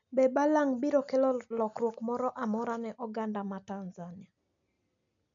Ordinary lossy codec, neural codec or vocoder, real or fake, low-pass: none; none; real; 7.2 kHz